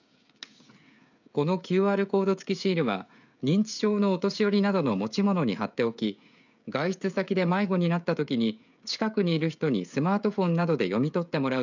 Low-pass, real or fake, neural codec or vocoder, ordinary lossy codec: 7.2 kHz; fake; codec, 16 kHz, 16 kbps, FreqCodec, smaller model; none